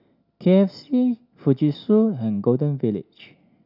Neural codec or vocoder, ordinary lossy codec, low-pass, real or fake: none; none; 5.4 kHz; real